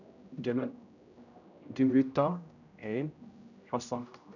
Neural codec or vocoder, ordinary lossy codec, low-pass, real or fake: codec, 16 kHz, 0.5 kbps, X-Codec, HuBERT features, trained on general audio; none; 7.2 kHz; fake